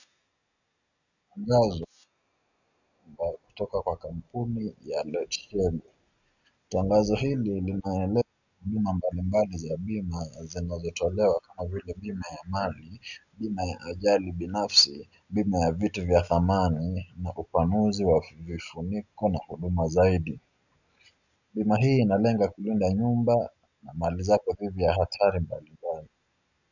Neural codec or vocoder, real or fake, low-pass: none; real; 7.2 kHz